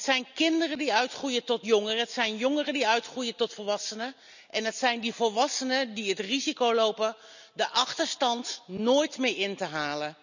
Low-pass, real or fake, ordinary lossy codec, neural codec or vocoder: 7.2 kHz; real; none; none